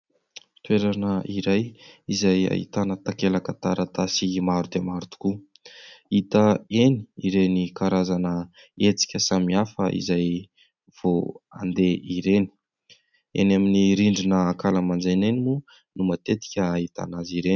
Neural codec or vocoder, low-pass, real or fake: none; 7.2 kHz; real